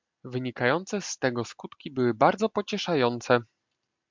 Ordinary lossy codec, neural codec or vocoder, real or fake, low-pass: MP3, 64 kbps; none; real; 7.2 kHz